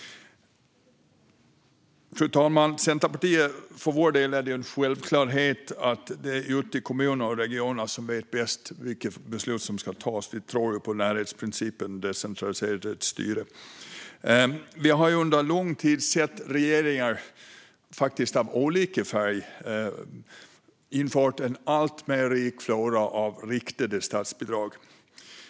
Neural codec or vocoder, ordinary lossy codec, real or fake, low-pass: none; none; real; none